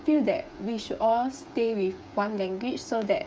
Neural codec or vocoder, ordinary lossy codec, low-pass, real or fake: codec, 16 kHz, 8 kbps, FreqCodec, smaller model; none; none; fake